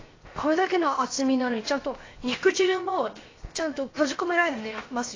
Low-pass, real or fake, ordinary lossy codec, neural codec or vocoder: 7.2 kHz; fake; AAC, 32 kbps; codec, 16 kHz, 0.7 kbps, FocalCodec